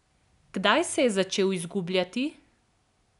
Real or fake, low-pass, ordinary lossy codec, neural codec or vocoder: real; 10.8 kHz; none; none